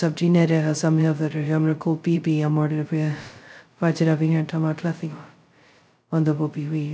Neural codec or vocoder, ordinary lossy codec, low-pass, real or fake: codec, 16 kHz, 0.2 kbps, FocalCodec; none; none; fake